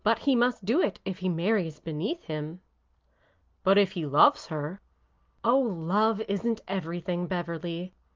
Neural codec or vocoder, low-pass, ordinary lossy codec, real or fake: none; 7.2 kHz; Opus, 24 kbps; real